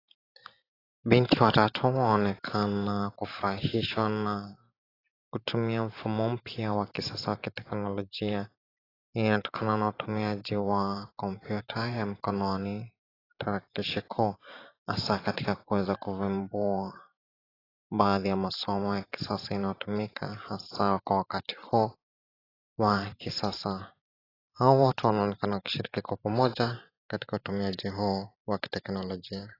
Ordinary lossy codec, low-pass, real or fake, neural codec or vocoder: AAC, 24 kbps; 5.4 kHz; fake; vocoder, 44.1 kHz, 128 mel bands every 512 samples, BigVGAN v2